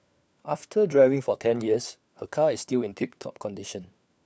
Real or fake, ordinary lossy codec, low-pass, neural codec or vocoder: fake; none; none; codec, 16 kHz, 4 kbps, FunCodec, trained on LibriTTS, 50 frames a second